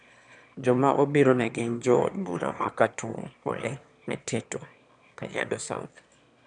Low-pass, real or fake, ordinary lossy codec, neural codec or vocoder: 9.9 kHz; fake; none; autoencoder, 22.05 kHz, a latent of 192 numbers a frame, VITS, trained on one speaker